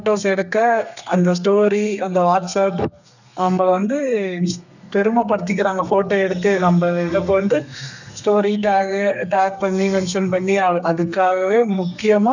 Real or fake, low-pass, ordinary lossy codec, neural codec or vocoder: fake; 7.2 kHz; none; codec, 32 kHz, 1.9 kbps, SNAC